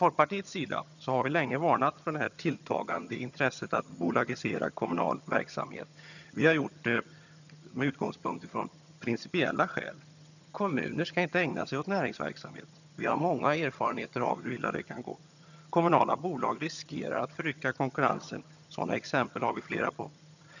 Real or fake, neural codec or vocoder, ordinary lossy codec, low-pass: fake; vocoder, 22.05 kHz, 80 mel bands, HiFi-GAN; none; 7.2 kHz